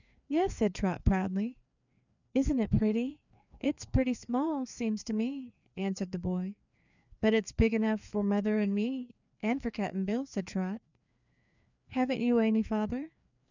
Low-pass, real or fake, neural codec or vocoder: 7.2 kHz; fake; codec, 16 kHz, 2 kbps, FreqCodec, larger model